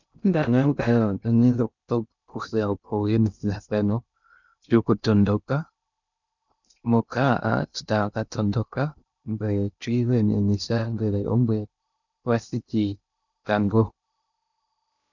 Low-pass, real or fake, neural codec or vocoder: 7.2 kHz; fake; codec, 16 kHz in and 24 kHz out, 0.6 kbps, FocalCodec, streaming, 2048 codes